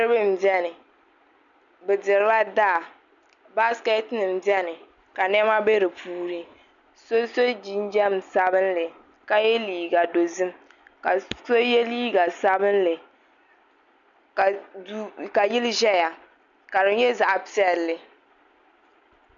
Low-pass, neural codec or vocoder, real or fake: 7.2 kHz; none; real